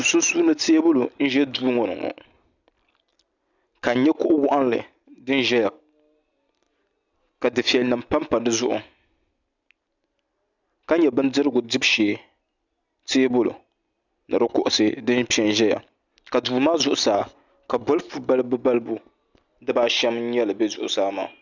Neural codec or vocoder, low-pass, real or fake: none; 7.2 kHz; real